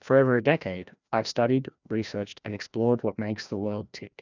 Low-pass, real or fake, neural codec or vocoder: 7.2 kHz; fake; codec, 16 kHz, 1 kbps, FreqCodec, larger model